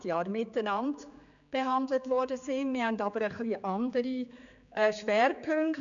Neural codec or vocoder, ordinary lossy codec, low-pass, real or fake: codec, 16 kHz, 4 kbps, X-Codec, HuBERT features, trained on general audio; none; 7.2 kHz; fake